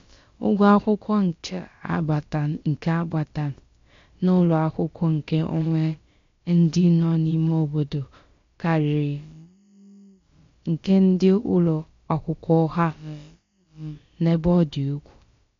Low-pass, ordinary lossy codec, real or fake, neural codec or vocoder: 7.2 kHz; MP3, 48 kbps; fake; codec, 16 kHz, about 1 kbps, DyCAST, with the encoder's durations